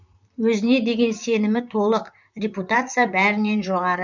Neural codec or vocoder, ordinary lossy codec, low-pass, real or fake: vocoder, 44.1 kHz, 128 mel bands, Pupu-Vocoder; none; 7.2 kHz; fake